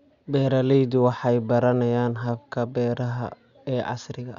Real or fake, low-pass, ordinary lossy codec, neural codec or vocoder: real; 7.2 kHz; Opus, 64 kbps; none